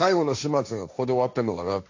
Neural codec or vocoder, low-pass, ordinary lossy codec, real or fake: codec, 16 kHz, 1.1 kbps, Voila-Tokenizer; 7.2 kHz; AAC, 48 kbps; fake